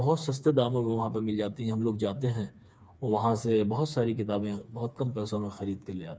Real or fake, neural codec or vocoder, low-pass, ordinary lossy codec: fake; codec, 16 kHz, 4 kbps, FreqCodec, smaller model; none; none